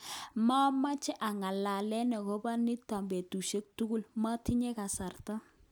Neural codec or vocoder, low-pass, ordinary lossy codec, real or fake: none; none; none; real